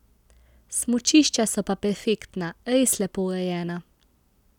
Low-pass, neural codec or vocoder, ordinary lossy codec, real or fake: 19.8 kHz; none; none; real